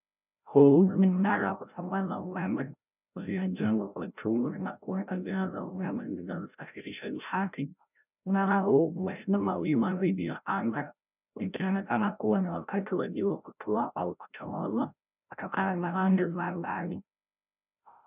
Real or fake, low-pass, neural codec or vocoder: fake; 3.6 kHz; codec, 16 kHz, 0.5 kbps, FreqCodec, larger model